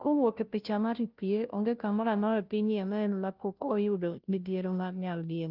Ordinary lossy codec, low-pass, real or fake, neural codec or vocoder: Opus, 32 kbps; 5.4 kHz; fake; codec, 16 kHz, 0.5 kbps, FunCodec, trained on Chinese and English, 25 frames a second